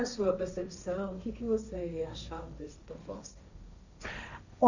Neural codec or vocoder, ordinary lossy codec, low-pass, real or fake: codec, 16 kHz, 1.1 kbps, Voila-Tokenizer; none; 7.2 kHz; fake